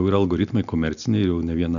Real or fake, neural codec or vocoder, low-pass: real; none; 7.2 kHz